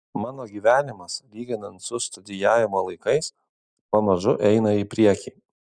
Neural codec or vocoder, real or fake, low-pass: none; real; 9.9 kHz